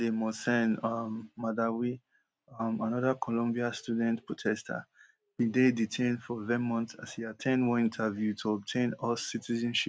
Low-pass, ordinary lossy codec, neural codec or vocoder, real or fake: none; none; none; real